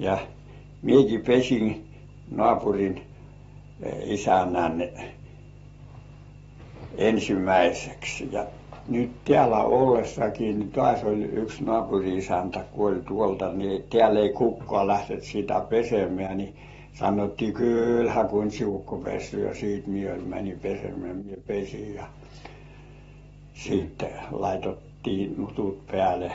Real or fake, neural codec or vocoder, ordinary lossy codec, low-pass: real; none; AAC, 24 kbps; 19.8 kHz